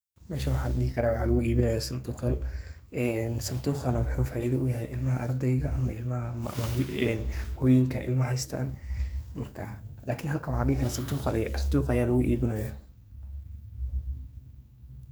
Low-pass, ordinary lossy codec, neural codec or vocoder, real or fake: none; none; codec, 44.1 kHz, 2.6 kbps, SNAC; fake